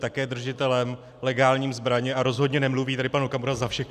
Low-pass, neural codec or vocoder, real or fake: 14.4 kHz; none; real